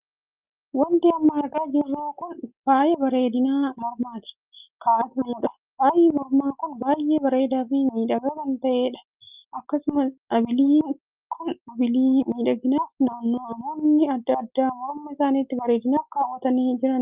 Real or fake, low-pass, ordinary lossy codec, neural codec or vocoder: real; 3.6 kHz; Opus, 24 kbps; none